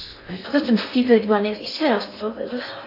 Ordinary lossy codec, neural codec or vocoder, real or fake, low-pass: AAC, 32 kbps; codec, 16 kHz in and 24 kHz out, 0.6 kbps, FocalCodec, streaming, 4096 codes; fake; 5.4 kHz